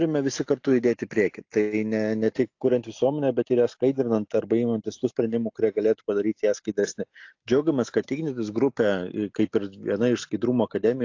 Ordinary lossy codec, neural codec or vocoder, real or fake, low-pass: AAC, 48 kbps; none; real; 7.2 kHz